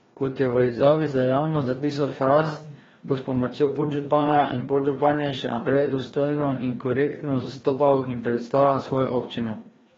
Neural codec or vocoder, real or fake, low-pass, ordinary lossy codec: codec, 16 kHz, 1 kbps, FreqCodec, larger model; fake; 7.2 kHz; AAC, 24 kbps